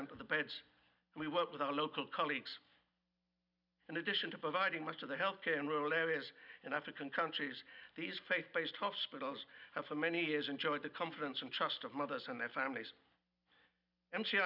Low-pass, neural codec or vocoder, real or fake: 5.4 kHz; none; real